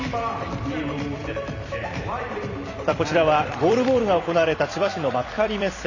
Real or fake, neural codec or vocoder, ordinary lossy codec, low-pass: fake; vocoder, 44.1 kHz, 128 mel bands every 256 samples, BigVGAN v2; none; 7.2 kHz